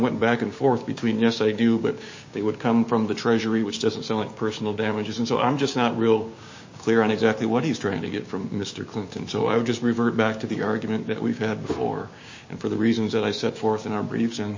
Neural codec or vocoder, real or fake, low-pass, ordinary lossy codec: codec, 16 kHz, 6 kbps, DAC; fake; 7.2 kHz; MP3, 32 kbps